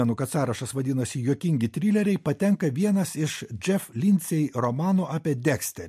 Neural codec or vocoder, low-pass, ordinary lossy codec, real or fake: none; 14.4 kHz; MP3, 64 kbps; real